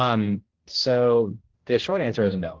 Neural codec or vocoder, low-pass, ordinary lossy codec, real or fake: codec, 16 kHz, 1 kbps, X-Codec, HuBERT features, trained on general audio; 7.2 kHz; Opus, 16 kbps; fake